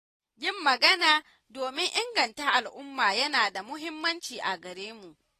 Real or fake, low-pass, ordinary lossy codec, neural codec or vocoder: real; 14.4 kHz; AAC, 48 kbps; none